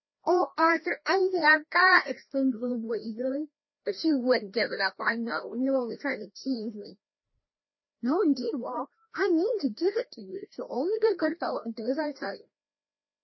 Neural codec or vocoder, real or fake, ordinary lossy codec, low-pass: codec, 16 kHz, 1 kbps, FreqCodec, larger model; fake; MP3, 24 kbps; 7.2 kHz